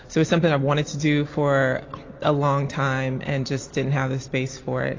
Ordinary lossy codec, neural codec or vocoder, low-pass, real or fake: AAC, 32 kbps; none; 7.2 kHz; real